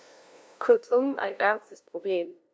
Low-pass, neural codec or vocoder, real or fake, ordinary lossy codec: none; codec, 16 kHz, 0.5 kbps, FunCodec, trained on LibriTTS, 25 frames a second; fake; none